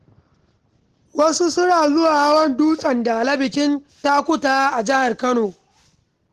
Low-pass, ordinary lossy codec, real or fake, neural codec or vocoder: 14.4 kHz; Opus, 16 kbps; real; none